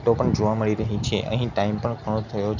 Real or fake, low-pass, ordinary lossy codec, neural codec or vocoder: real; 7.2 kHz; none; none